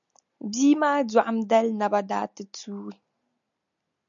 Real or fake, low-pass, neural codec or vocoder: real; 7.2 kHz; none